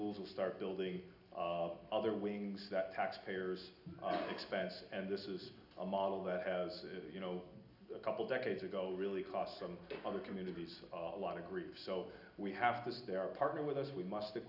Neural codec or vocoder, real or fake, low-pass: none; real; 5.4 kHz